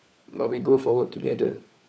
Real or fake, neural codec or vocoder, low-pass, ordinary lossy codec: fake; codec, 16 kHz, 4 kbps, FunCodec, trained on LibriTTS, 50 frames a second; none; none